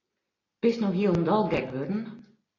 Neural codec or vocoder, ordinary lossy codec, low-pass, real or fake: none; AAC, 32 kbps; 7.2 kHz; real